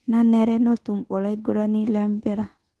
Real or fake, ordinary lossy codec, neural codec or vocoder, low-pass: fake; Opus, 16 kbps; codec, 24 kHz, 1.2 kbps, DualCodec; 10.8 kHz